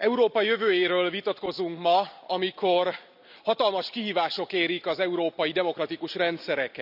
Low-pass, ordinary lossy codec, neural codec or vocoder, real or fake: 5.4 kHz; none; none; real